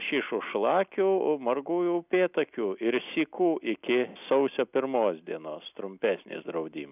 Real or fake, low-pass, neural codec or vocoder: real; 3.6 kHz; none